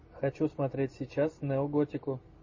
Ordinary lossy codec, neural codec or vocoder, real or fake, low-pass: MP3, 32 kbps; none; real; 7.2 kHz